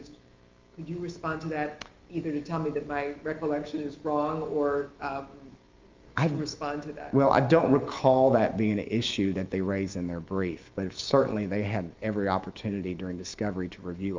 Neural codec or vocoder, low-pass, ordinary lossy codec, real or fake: autoencoder, 48 kHz, 128 numbers a frame, DAC-VAE, trained on Japanese speech; 7.2 kHz; Opus, 24 kbps; fake